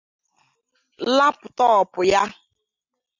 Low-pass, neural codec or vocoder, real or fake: 7.2 kHz; none; real